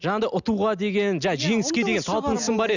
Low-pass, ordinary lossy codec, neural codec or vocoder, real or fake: 7.2 kHz; none; none; real